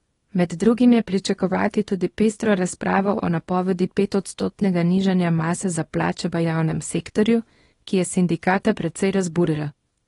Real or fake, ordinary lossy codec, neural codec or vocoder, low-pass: fake; AAC, 32 kbps; codec, 24 kHz, 0.9 kbps, WavTokenizer, small release; 10.8 kHz